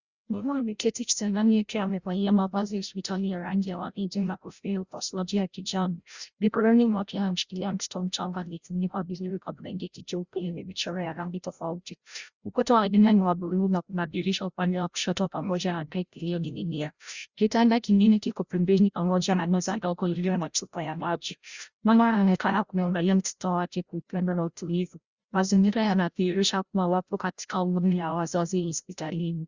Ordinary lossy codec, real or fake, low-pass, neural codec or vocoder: Opus, 64 kbps; fake; 7.2 kHz; codec, 16 kHz, 0.5 kbps, FreqCodec, larger model